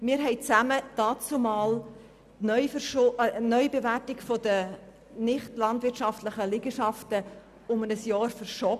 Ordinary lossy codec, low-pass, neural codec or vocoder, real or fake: none; 14.4 kHz; none; real